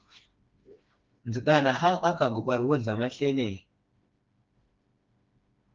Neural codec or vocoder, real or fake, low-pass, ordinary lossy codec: codec, 16 kHz, 2 kbps, FreqCodec, smaller model; fake; 7.2 kHz; Opus, 24 kbps